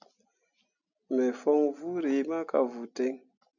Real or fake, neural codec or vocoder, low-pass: real; none; 7.2 kHz